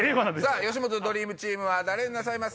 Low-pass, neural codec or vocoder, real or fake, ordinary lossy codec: none; none; real; none